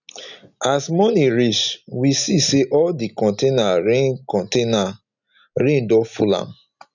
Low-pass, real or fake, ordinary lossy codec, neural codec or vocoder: 7.2 kHz; real; none; none